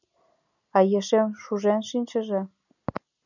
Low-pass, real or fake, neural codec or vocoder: 7.2 kHz; real; none